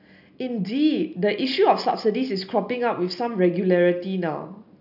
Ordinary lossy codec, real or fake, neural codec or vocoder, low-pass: none; real; none; 5.4 kHz